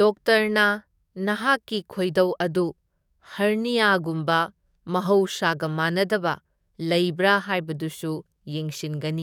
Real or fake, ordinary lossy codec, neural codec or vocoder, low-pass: fake; none; codec, 44.1 kHz, 7.8 kbps, DAC; 19.8 kHz